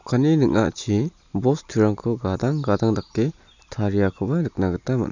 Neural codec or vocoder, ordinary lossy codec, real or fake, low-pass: none; none; real; 7.2 kHz